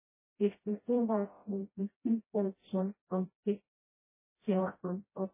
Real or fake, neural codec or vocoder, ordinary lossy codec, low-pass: fake; codec, 16 kHz, 0.5 kbps, FreqCodec, smaller model; MP3, 16 kbps; 3.6 kHz